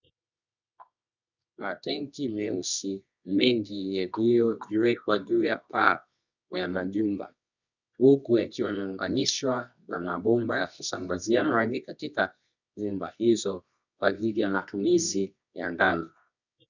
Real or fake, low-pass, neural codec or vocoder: fake; 7.2 kHz; codec, 24 kHz, 0.9 kbps, WavTokenizer, medium music audio release